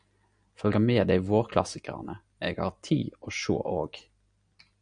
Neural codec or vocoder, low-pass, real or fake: none; 9.9 kHz; real